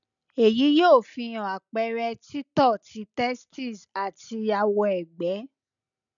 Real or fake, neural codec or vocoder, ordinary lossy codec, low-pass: real; none; none; 7.2 kHz